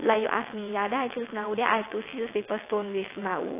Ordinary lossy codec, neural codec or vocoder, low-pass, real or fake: AAC, 24 kbps; vocoder, 22.05 kHz, 80 mel bands, WaveNeXt; 3.6 kHz; fake